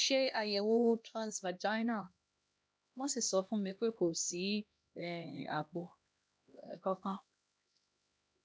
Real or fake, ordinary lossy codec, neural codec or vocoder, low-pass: fake; none; codec, 16 kHz, 1 kbps, X-Codec, HuBERT features, trained on LibriSpeech; none